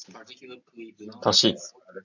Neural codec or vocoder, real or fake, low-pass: none; real; 7.2 kHz